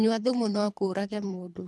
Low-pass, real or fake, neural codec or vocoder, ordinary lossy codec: none; fake; codec, 24 kHz, 3 kbps, HILCodec; none